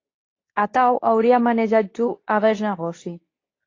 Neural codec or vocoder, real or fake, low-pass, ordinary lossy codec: codec, 24 kHz, 0.9 kbps, WavTokenizer, medium speech release version 2; fake; 7.2 kHz; AAC, 32 kbps